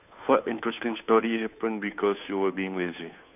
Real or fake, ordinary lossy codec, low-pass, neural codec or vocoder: fake; none; 3.6 kHz; codec, 16 kHz, 2 kbps, FunCodec, trained on Chinese and English, 25 frames a second